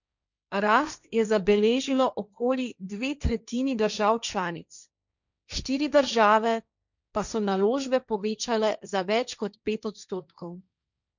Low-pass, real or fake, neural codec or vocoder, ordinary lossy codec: 7.2 kHz; fake; codec, 16 kHz, 1.1 kbps, Voila-Tokenizer; none